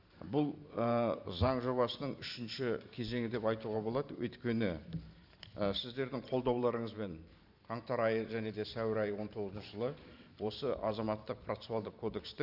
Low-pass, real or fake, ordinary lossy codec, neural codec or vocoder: 5.4 kHz; fake; none; vocoder, 44.1 kHz, 128 mel bands every 512 samples, BigVGAN v2